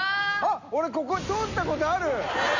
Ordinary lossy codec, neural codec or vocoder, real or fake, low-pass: none; none; real; 7.2 kHz